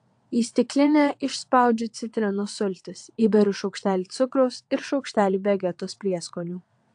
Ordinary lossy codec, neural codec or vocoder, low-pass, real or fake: AAC, 64 kbps; vocoder, 22.05 kHz, 80 mel bands, WaveNeXt; 9.9 kHz; fake